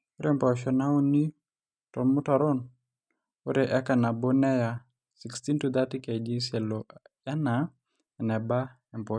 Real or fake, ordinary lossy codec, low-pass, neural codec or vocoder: real; none; 9.9 kHz; none